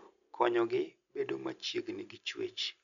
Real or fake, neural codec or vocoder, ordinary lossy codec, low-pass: real; none; none; 7.2 kHz